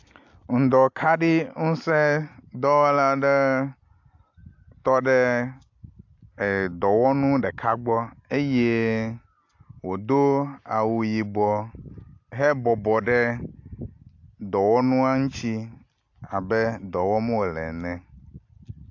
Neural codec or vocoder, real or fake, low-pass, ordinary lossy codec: none; real; 7.2 kHz; AAC, 48 kbps